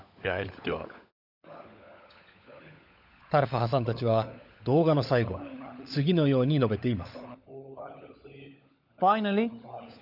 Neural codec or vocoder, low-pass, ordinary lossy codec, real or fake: codec, 16 kHz, 16 kbps, FunCodec, trained on LibriTTS, 50 frames a second; 5.4 kHz; none; fake